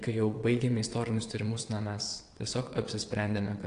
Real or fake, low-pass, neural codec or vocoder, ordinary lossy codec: fake; 9.9 kHz; vocoder, 22.05 kHz, 80 mel bands, WaveNeXt; AAC, 48 kbps